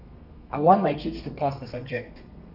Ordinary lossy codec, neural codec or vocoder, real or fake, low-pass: none; codec, 32 kHz, 1.9 kbps, SNAC; fake; 5.4 kHz